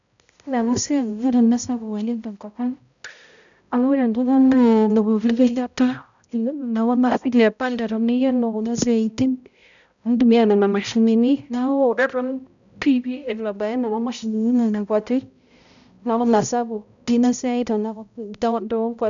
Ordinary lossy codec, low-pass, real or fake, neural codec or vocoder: none; 7.2 kHz; fake; codec, 16 kHz, 0.5 kbps, X-Codec, HuBERT features, trained on balanced general audio